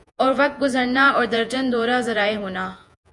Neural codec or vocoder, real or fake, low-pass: vocoder, 48 kHz, 128 mel bands, Vocos; fake; 10.8 kHz